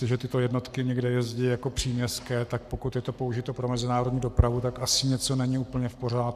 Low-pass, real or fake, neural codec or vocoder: 14.4 kHz; fake; codec, 44.1 kHz, 7.8 kbps, Pupu-Codec